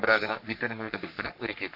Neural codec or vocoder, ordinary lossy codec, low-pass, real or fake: codec, 44.1 kHz, 3.4 kbps, Pupu-Codec; none; 5.4 kHz; fake